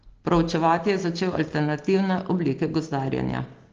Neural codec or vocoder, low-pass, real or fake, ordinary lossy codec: none; 7.2 kHz; real; Opus, 16 kbps